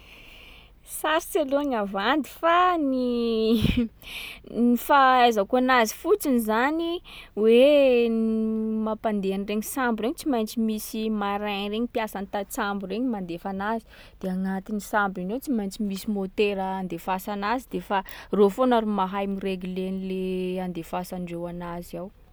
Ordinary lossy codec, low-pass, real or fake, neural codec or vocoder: none; none; real; none